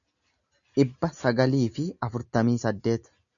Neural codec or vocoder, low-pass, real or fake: none; 7.2 kHz; real